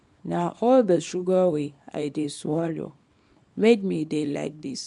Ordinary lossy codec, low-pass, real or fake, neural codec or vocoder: MP3, 64 kbps; 10.8 kHz; fake; codec, 24 kHz, 0.9 kbps, WavTokenizer, small release